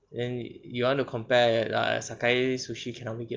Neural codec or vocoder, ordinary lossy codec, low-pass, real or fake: none; Opus, 32 kbps; 7.2 kHz; real